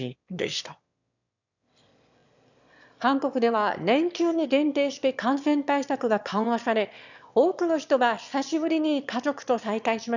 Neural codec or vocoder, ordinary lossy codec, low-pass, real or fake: autoencoder, 22.05 kHz, a latent of 192 numbers a frame, VITS, trained on one speaker; none; 7.2 kHz; fake